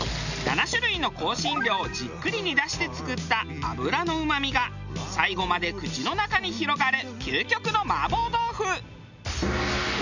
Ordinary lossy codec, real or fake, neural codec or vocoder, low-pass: none; real; none; 7.2 kHz